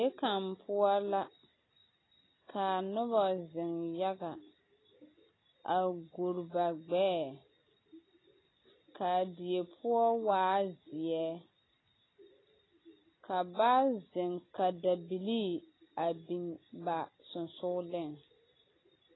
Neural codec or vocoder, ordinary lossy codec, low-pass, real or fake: none; AAC, 16 kbps; 7.2 kHz; real